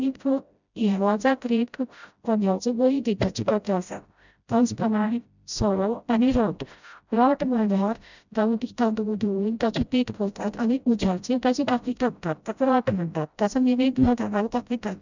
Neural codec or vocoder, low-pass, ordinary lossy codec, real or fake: codec, 16 kHz, 0.5 kbps, FreqCodec, smaller model; 7.2 kHz; none; fake